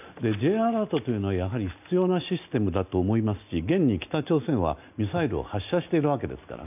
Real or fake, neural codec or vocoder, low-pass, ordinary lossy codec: real; none; 3.6 kHz; none